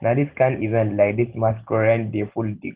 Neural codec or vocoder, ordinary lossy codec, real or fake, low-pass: none; Opus, 16 kbps; real; 3.6 kHz